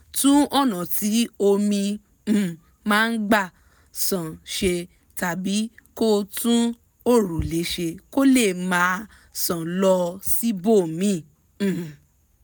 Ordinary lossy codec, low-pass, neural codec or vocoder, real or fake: none; none; none; real